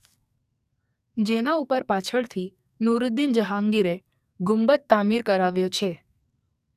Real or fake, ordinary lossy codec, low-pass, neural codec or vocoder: fake; none; 14.4 kHz; codec, 44.1 kHz, 2.6 kbps, SNAC